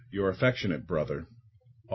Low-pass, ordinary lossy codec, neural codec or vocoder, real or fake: 7.2 kHz; MP3, 24 kbps; none; real